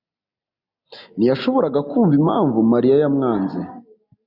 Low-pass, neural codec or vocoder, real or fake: 5.4 kHz; none; real